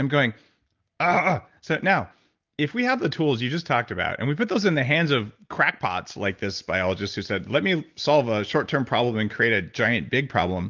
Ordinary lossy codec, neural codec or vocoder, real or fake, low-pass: Opus, 32 kbps; none; real; 7.2 kHz